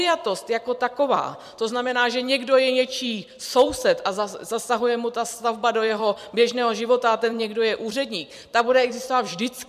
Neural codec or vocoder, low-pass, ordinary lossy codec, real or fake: vocoder, 44.1 kHz, 128 mel bands every 256 samples, BigVGAN v2; 14.4 kHz; MP3, 96 kbps; fake